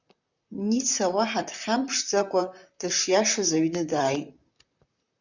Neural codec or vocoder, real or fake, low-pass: vocoder, 44.1 kHz, 128 mel bands, Pupu-Vocoder; fake; 7.2 kHz